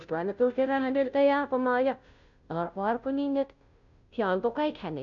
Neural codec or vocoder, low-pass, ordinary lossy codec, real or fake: codec, 16 kHz, 0.5 kbps, FunCodec, trained on Chinese and English, 25 frames a second; 7.2 kHz; none; fake